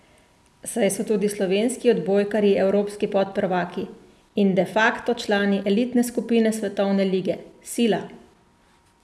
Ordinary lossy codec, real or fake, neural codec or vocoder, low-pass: none; real; none; none